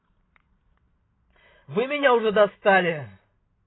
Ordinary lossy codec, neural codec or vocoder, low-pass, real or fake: AAC, 16 kbps; none; 7.2 kHz; real